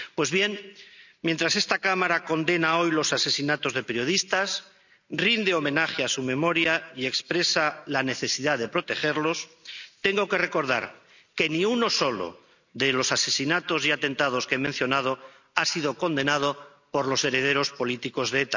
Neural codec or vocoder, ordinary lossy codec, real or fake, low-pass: none; none; real; 7.2 kHz